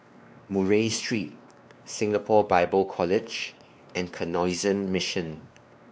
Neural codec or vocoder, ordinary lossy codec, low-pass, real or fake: codec, 16 kHz, 2 kbps, X-Codec, WavLM features, trained on Multilingual LibriSpeech; none; none; fake